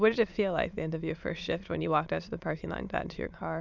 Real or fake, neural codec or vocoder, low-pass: fake; autoencoder, 22.05 kHz, a latent of 192 numbers a frame, VITS, trained on many speakers; 7.2 kHz